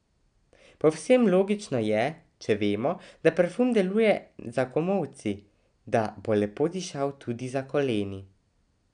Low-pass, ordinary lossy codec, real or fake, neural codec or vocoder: 9.9 kHz; none; real; none